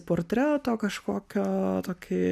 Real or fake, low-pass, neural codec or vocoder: real; 14.4 kHz; none